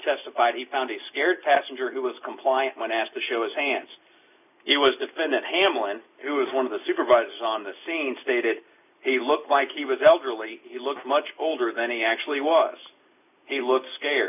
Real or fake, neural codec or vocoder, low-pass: real; none; 3.6 kHz